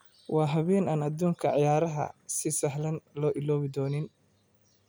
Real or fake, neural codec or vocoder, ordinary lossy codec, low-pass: real; none; none; none